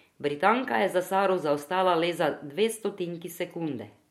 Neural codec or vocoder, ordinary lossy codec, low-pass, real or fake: none; MP3, 64 kbps; 19.8 kHz; real